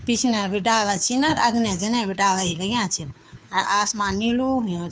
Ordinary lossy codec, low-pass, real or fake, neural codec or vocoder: none; none; fake; codec, 16 kHz, 2 kbps, FunCodec, trained on Chinese and English, 25 frames a second